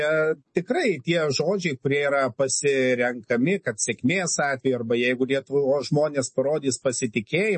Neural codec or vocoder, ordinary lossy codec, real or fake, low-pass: none; MP3, 32 kbps; real; 10.8 kHz